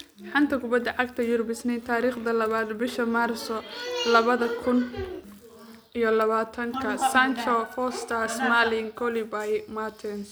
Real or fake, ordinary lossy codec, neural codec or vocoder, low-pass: real; none; none; none